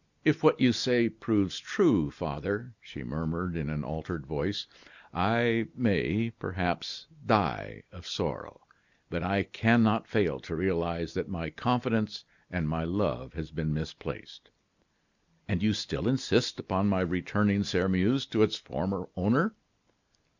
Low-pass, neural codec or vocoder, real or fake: 7.2 kHz; none; real